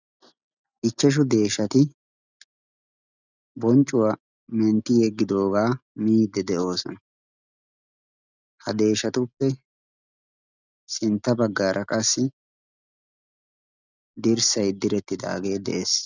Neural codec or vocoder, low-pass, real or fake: none; 7.2 kHz; real